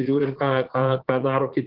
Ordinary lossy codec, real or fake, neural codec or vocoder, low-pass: Opus, 16 kbps; fake; vocoder, 44.1 kHz, 80 mel bands, Vocos; 5.4 kHz